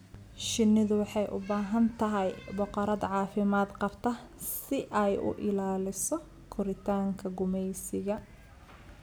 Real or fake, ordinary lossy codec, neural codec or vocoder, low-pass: real; none; none; none